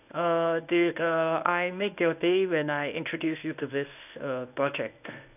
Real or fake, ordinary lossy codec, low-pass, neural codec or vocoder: fake; none; 3.6 kHz; codec, 24 kHz, 0.9 kbps, WavTokenizer, medium speech release version 1